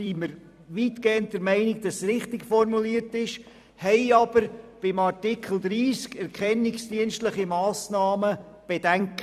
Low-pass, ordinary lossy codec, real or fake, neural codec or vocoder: 14.4 kHz; none; fake; vocoder, 44.1 kHz, 128 mel bands every 256 samples, BigVGAN v2